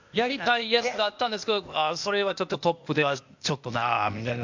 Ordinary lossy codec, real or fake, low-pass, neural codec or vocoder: MP3, 64 kbps; fake; 7.2 kHz; codec, 16 kHz, 0.8 kbps, ZipCodec